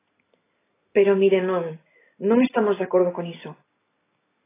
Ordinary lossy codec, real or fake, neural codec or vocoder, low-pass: AAC, 16 kbps; real; none; 3.6 kHz